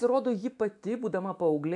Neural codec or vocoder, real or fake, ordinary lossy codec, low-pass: autoencoder, 48 kHz, 128 numbers a frame, DAC-VAE, trained on Japanese speech; fake; AAC, 64 kbps; 10.8 kHz